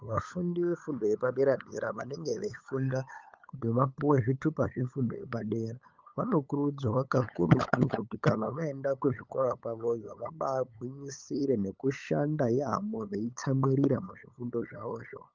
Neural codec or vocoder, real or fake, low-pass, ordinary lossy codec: codec, 16 kHz, 8 kbps, FunCodec, trained on LibriTTS, 25 frames a second; fake; 7.2 kHz; Opus, 24 kbps